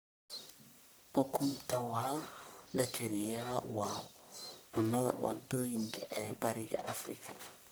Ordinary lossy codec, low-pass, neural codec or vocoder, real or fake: none; none; codec, 44.1 kHz, 1.7 kbps, Pupu-Codec; fake